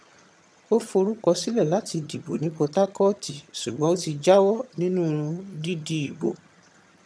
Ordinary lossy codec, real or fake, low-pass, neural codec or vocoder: none; fake; none; vocoder, 22.05 kHz, 80 mel bands, HiFi-GAN